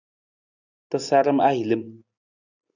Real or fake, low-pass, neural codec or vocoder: real; 7.2 kHz; none